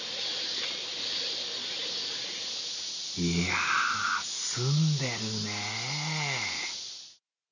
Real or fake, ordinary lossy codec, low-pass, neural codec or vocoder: real; none; 7.2 kHz; none